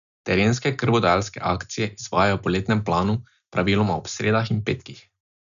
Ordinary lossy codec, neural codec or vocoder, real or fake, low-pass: none; none; real; 7.2 kHz